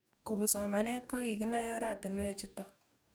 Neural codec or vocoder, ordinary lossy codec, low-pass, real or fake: codec, 44.1 kHz, 2.6 kbps, DAC; none; none; fake